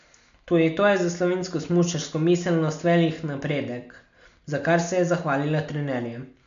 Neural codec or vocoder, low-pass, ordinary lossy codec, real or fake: none; 7.2 kHz; MP3, 64 kbps; real